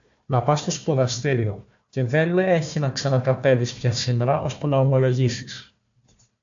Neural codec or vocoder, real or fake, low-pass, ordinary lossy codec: codec, 16 kHz, 1 kbps, FunCodec, trained on Chinese and English, 50 frames a second; fake; 7.2 kHz; AAC, 64 kbps